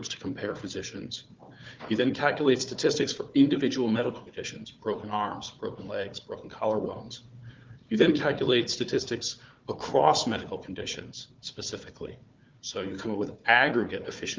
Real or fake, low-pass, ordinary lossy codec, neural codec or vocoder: fake; 7.2 kHz; Opus, 24 kbps; codec, 16 kHz, 4 kbps, FunCodec, trained on Chinese and English, 50 frames a second